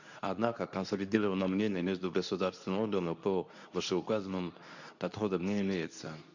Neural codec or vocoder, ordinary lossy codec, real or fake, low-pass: codec, 24 kHz, 0.9 kbps, WavTokenizer, medium speech release version 2; none; fake; 7.2 kHz